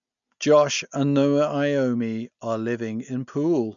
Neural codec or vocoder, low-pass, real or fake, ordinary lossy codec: none; 7.2 kHz; real; none